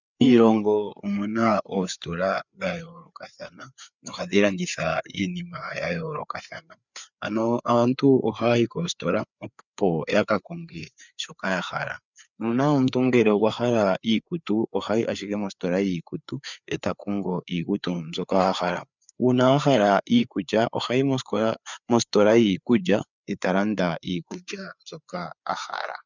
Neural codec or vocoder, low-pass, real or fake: codec, 16 kHz, 4 kbps, FreqCodec, larger model; 7.2 kHz; fake